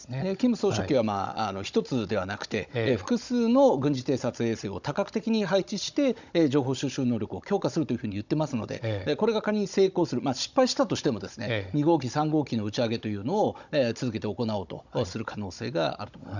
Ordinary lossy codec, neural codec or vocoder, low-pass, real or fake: none; codec, 16 kHz, 16 kbps, FunCodec, trained on Chinese and English, 50 frames a second; 7.2 kHz; fake